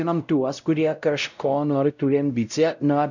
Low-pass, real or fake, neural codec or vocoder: 7.2 kHz; fake; codec, 16 kHz, 0.5 kbps, X-Codec, WavLM features, trained on Multilingual LibriSpeech